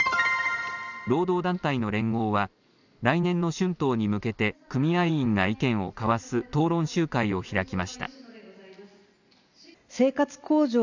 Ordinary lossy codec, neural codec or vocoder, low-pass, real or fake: none; none; 7.2 kHz; real